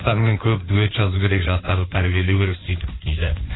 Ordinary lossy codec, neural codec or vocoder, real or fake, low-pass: AAC, 16 kbps; codec, 16 kHz in and 24 kHz out, 2.2 kbps, FireRedTTS-2 codec; fake; 7.2 kHz